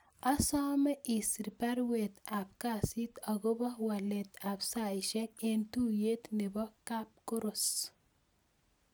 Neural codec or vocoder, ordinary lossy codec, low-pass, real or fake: none; none; none; real